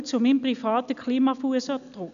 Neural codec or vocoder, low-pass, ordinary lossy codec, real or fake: none; 7.2 kHz; MP3, 96 kbps; real